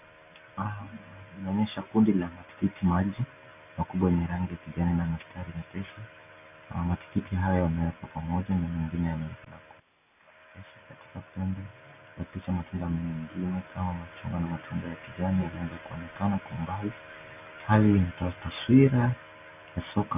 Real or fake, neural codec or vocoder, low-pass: real; none; 3.6 kHz